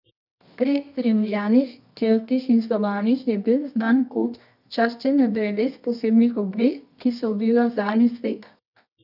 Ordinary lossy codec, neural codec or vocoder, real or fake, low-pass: none; codec, 24 kHz, 0.9 kbps, WavTokenizer, medium music audio release; fake; 5.4 kHz